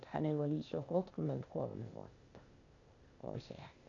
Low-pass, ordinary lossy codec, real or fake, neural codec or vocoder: 7.2 kHz; none; fake; codec, 16 kHz, 0.8 kbps, ZipCodec